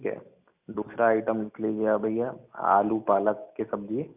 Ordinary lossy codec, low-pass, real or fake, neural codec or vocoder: none; 3.6 kHz; real; none